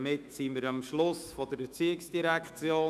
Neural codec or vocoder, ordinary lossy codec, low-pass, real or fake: autoencoder, 48 kHz, 128 numbers a frame, DAC-VAE, trained on Japanese speech; none; 14.4 kHz; fake